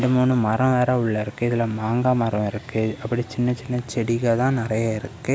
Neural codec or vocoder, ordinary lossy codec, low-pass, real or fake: none; none; none; real